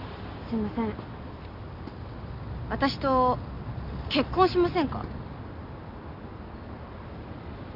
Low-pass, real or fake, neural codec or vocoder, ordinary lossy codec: 5.4 kHz; real; none; none